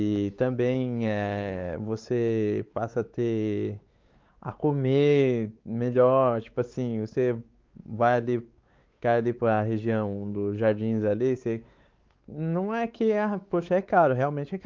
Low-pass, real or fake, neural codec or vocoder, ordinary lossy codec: 7.2 kHz; fake; codec, 16 kHz, 4 kbps, X-Codec, WavLM features, trained on Multilingual LibriSpeech; Opus, 32 kbps